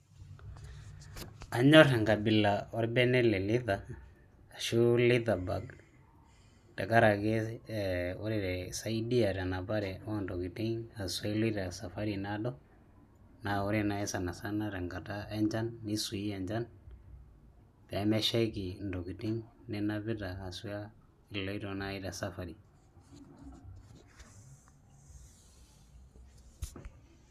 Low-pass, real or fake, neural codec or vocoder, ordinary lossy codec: 14.4 kHz; real; none; none